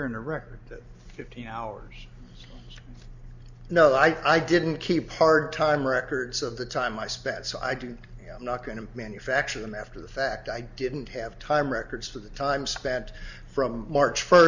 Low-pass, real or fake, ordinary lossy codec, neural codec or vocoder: 7.2 kHz; real; Opus, 64 kbps; none